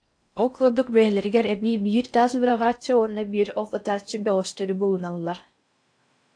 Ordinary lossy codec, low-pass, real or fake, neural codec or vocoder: AAC, 64 kbps; 9.9 kHz; fake; codec, 16 kHz in and 24 kHz out, 0.6 kbps, FocalCodec, streaming, 4096 codes